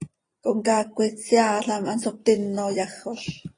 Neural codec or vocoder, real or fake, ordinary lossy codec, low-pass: none; real; AAC, 32 kbps; 9.9 kHz